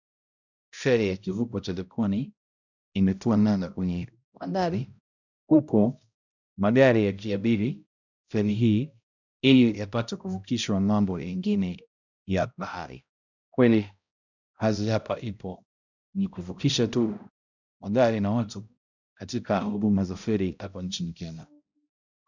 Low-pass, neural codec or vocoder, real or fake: 7.2 kHz; codec, 16 kHz, 0.5 kbps, X-Codec, HuBERT features, trained on balanced general audio; fake